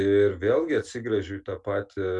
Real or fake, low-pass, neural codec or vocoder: real; 10.8 kHz; none